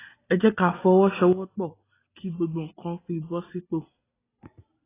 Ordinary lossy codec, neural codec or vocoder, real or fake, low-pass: AAC, 16 kbps; none; real; 3.6 kHz